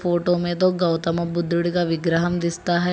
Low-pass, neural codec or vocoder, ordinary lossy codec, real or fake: none; none; none; real